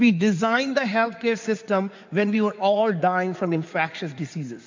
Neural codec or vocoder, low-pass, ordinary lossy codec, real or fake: codec, 16 kHz in and 24 kHz out, 2.2 kbps, FireRedTTS-2 codec; 7.2 kHz; MP3, 48 kbps; fake